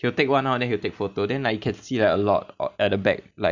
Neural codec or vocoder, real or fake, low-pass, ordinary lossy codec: none; real; 7.2 kHz; none